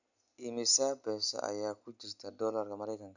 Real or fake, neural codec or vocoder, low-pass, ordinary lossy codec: real; none; 7.2 kHz; none